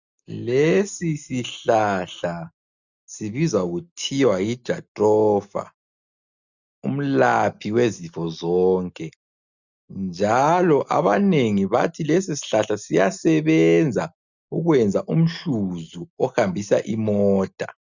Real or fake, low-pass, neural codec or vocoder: real; 7.2 kHz; none